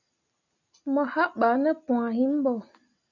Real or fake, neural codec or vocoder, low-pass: real; none; 7.2 kHz